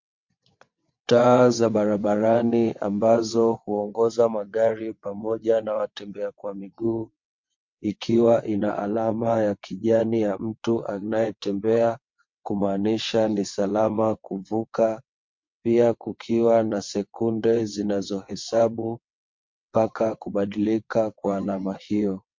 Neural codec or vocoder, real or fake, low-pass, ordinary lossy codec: vocoder, 22.05 kHz, 80 mel bands, WaveNeXt; fake; 7.2 kHz; MP3, 48 kbps